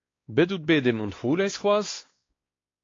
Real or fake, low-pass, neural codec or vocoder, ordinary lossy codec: fake; 7.2 kHz; codec, 16 kHz, 2 kbps, X-Codec, WavLM features, trained on Multilingual LibriSpeech; AAC, 32 kbps